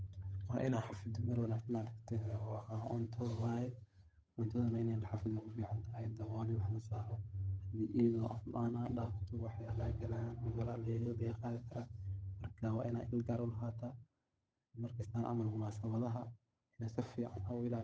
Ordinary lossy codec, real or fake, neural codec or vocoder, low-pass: none; fake; codec, 16 kHz, 8 kbps, FunCodec, trained on Chinese and English, 25 frames a second; none